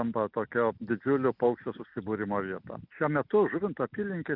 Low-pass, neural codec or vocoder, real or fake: 5.4 kHz; none; real